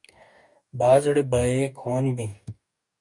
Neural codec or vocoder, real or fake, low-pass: codec, 44.1 kHz, 2.6 kbps, DAC; fake; 10.8 kHz